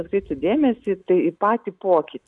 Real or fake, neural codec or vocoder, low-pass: real; none; 10.8 kHz